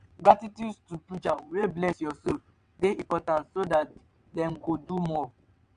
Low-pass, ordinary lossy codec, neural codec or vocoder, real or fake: 9.9 kHz; AAC, 96 kbps; vocoder, 22.05 kHz, 80 mel bands, WaveNeXt; fake